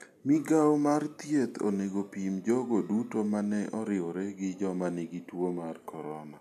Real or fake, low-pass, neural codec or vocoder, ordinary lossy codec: real; 14.4 kHz; none; none